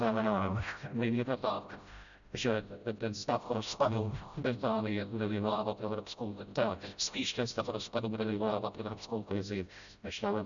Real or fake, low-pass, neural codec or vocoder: fake; 7.2 kHz; codec, 16 kHz, 0.5 kbps, FreqCodec, smaller model